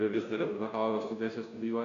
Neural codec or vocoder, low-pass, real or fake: codec, 16 kHz, 0.5 kbps, FunCodec, trained on Chinese and English, 25 frames a second; 7.2 kHz; fake